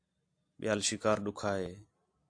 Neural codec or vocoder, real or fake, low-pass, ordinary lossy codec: none; real; 9.9 kHz; AAC, 64 kbps